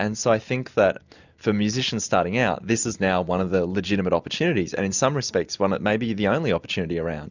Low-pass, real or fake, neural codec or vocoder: 7.2 kHz; real; none